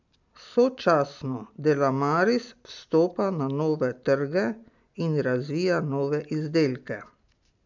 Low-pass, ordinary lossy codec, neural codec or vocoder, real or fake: 7.2 kHz; MP3, 64 kbps; none; real